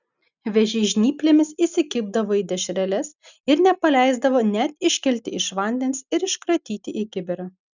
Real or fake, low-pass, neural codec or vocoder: real; 7.2 kHz; none